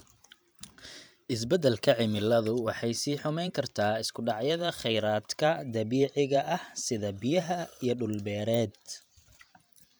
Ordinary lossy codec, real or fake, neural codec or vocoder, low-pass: none; fake; vocoder, 44.1 kHz, 128 mel bands every 512 samples, BigVGAN v2; none